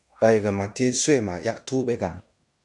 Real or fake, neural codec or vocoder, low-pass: fake; codec, 16 kHz in and 24 kHz out, 0.9 kbps, LongCat-Audio-Codec, fine tuned four codebook decoder; 10.8 kHz